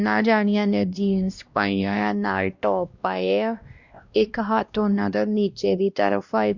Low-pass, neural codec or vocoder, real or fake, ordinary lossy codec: 7.2 kHz; codec, 16 kHz, 1 kbps, X-Codec, WavLM features, trained on Multilingual LibriSpeech; fake; Opus, 64 kbps